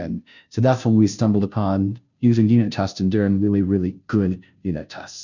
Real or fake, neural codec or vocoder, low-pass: fake; codec, 16 kHz, 0.5 kbps, FunCodec, trained on Chinese and English, 25 frames a second; 7.2 kHz